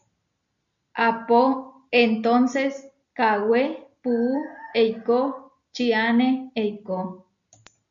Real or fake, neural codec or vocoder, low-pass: real; none; 7.2 kHz